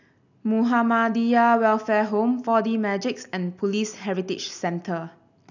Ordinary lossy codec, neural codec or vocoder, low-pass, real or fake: none; none; 7.2 kHz; real